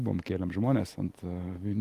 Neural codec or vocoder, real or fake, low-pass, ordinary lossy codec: none; real; 14.4 kHz; Opus, 24 kbps